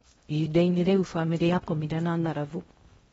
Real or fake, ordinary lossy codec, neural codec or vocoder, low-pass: fake; AAC, 24 kbps; codec, 16 kHz in and 24 kHz out, 0.6 kbps, FocalCodec, streaming, 2048 codes; 10.8 kHz